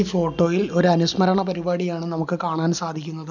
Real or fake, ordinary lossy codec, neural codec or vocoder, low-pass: real; none; none; 7.2 kHz